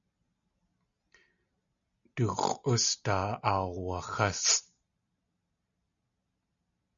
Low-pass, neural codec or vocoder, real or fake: 7.2 kHz; none; real